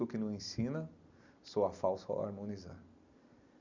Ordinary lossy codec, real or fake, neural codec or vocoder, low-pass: none; real; none; 7.2 kHz